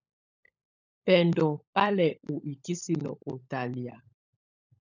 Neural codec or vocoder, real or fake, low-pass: codec, 16 kHz, 16 kbps, FunCodec, trained on LibriTTS, 50 frames a second; fake; 7.2 kHz